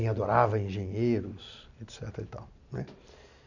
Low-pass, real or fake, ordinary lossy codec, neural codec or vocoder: 7.2 kHz; real; none; none